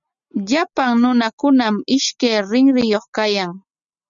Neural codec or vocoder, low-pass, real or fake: none; 7.2 kHz; real